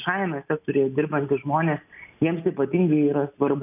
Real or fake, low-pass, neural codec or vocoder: real; 3.6 kHz; none